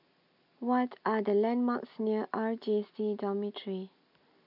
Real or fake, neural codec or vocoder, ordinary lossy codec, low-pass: real; none; none; 5.4 kHz